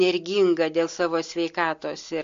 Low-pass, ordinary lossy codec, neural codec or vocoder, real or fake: 7.2 kHz; MP3, 48 kbps; none; real